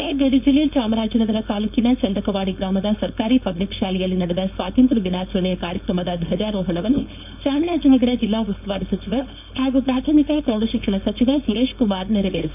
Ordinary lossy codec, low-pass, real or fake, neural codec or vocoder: none; 3.6 kHz; fake; codec, 16 kHz, 4.8 kbps, FACodec